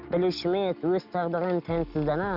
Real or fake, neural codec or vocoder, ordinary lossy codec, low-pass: real; none; none; 5.4 kHz